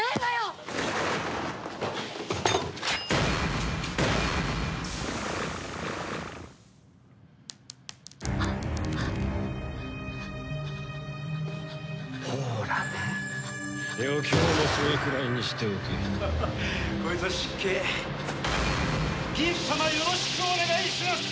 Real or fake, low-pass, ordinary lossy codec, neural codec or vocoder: real; none; none; none